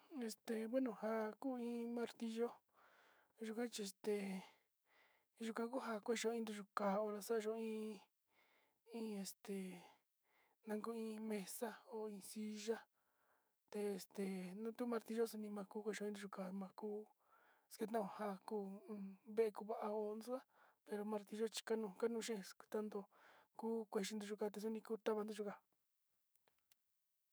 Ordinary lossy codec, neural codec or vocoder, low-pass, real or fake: none; autoencoder, 48 kHz, 128 numbers a frame, DAC-VAE, trained on Japanese speech; none; fake